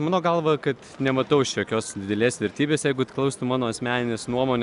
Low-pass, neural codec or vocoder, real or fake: 10.8 kHz; none; real